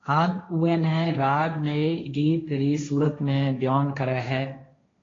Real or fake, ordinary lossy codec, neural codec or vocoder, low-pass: fake; AAC, 32 kbps; codec, 16 kHz, 1.1 kbps, Voila-Tokenizer; 7.2 kHz